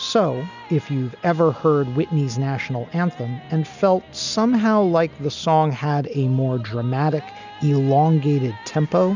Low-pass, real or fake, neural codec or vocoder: 7.2 kHz; real; none